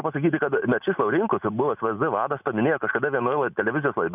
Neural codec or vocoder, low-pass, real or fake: none; 3.6 kHz; real